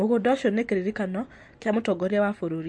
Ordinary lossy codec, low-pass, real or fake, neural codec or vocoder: MP3, 48 kbps; 9.9 kHz; real; none